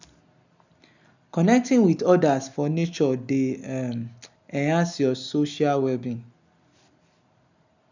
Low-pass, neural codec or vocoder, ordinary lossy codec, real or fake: 7.2 kHz; none; none; real